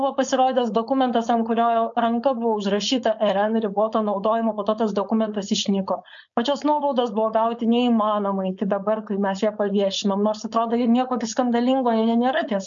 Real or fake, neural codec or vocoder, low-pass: fake; codec, 16 kHz, 4.8 kbps, FACodec; 7.2 kHz